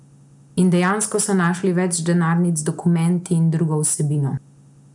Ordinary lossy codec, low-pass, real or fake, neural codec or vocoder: none; 10.8 kHz; real; none